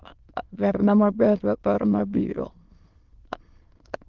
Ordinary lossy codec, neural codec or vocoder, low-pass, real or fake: Opus, 16 kbps; autoencoder, 22.05 kHz, a latent of 192 numbers a frame, VITS, trained on many speakers; 7.2 kHz; fake